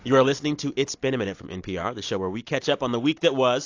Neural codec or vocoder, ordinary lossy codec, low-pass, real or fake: none; AAC, 48 kbps; 7.2 kHz; real